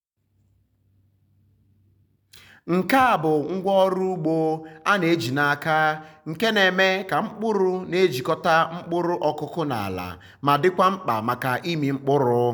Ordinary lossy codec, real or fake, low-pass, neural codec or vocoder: none; real; none; none